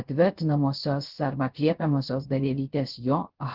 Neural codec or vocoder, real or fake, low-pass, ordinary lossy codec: codec, 16 kHz, 0.3 kbps, FocalCodec; fake; 5.4 kHz; Opus, 16 kbps